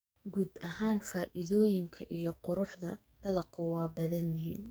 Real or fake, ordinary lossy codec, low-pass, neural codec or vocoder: fake; none; none; codec, 44.1 kHz, 2.6 kbps, SNAC